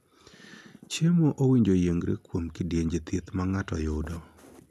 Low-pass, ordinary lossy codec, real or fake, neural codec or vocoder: 14.4 kHz; none; real; none